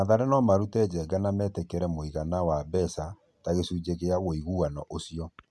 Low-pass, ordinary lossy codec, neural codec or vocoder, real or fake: none; none; none; real